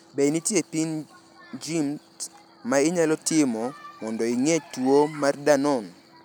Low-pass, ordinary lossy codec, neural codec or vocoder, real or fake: none; none; none; real